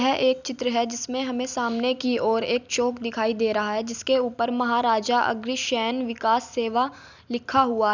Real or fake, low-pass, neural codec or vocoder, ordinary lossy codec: real; 7.2 kHz; none; none